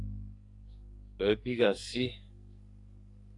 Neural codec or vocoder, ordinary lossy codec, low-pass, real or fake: codec, 44.1 kHz, 2.6 kbps, SNAC; AAC, 48 kbps; 10.8 kHz; fake